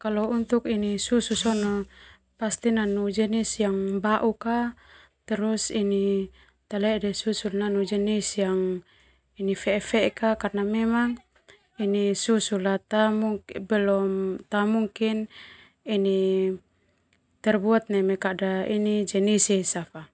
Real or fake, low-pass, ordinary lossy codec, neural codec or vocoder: real; none; none; none